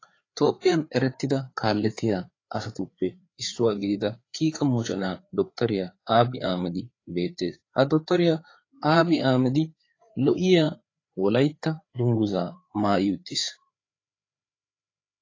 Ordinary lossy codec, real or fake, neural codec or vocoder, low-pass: AAC, 32 kbps; fake; codec, 16 kHz, 4 kbps, FreqCodec, larger model; 7.2 kHz